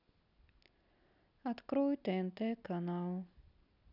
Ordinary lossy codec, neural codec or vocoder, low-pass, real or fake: none; none; 5.4 kHz; real